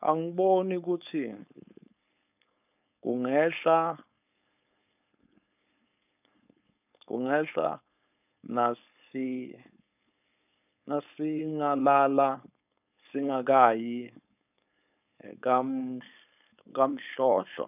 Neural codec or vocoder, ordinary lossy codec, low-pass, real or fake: codec, 16 kHz, 4.8 kbps, FACodec; none; 3.6 kHz; fake